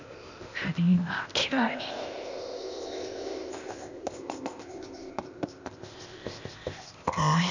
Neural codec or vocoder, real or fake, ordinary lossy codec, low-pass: codec, 16 kHz, 0.8 kbps, ZipCodec; fake; none; 7.2 kHz